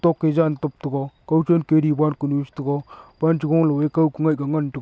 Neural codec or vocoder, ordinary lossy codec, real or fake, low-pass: none; none; real; none